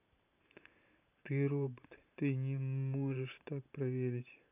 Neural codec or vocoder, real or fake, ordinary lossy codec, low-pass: vocoder, 44.1 kHz, 128 mel bands every 512 samples, BigVGAN v2; fake; none; 3.6 kHz